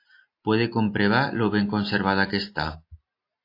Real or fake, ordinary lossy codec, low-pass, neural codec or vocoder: real; AAC, 32 kbps; 5.4 kHz; none